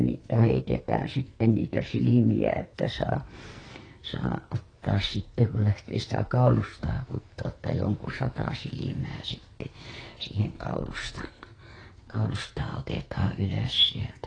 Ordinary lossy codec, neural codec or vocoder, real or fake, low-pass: AAC, 32 kbps; codec, 44.1 kHz, 2.6 kbps, SNAC; fake; 9.9 kHz